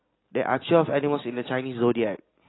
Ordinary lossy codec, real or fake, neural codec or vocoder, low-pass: AAC, 16 kbps; real; none; 7.2 kHz